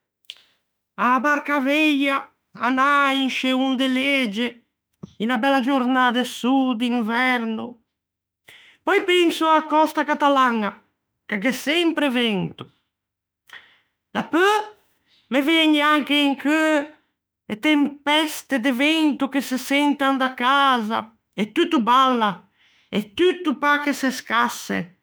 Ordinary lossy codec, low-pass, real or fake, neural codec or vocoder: none; none; fake; autoencoder, 48 kHz, 32 numbers a frame, DAC-VAE, trained on Japanese speech